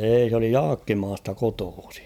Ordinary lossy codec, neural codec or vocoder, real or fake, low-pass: none; vocoder, 44.1 kHz, 128 mel bands every 512 samples, BigVGAN v2; fake; 19.8 kHz